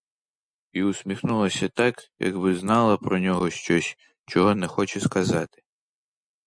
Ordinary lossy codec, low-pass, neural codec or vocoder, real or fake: MP3, 96 kbps; 9.9 kHz; none; real